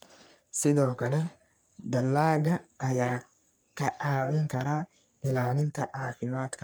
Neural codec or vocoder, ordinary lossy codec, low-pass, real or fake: codec, 44.1 kHz, 3.4 kbps, Pupu-Codec; none; none; fake